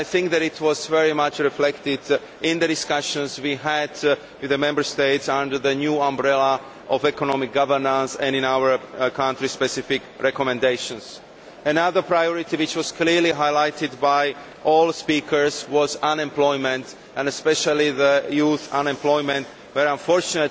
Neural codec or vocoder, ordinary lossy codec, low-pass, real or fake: none; none; none; real